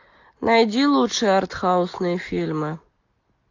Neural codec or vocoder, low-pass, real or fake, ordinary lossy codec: none; 7.2 kHz; real; AAC, 48 kbps